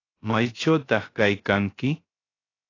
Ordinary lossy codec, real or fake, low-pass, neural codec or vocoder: AAC, 32 kbps; fake; 7.2 kHz; codec, 16 kHz, 0.3 kbps, FocalCodec